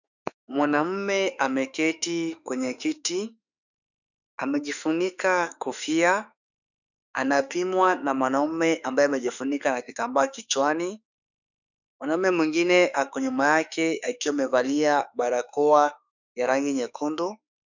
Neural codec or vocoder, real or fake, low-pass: autoencoder, 48 kHz, 32 numbers a frame, DAC-VAE, trained on Japanese speech; fake; 7.2 kHz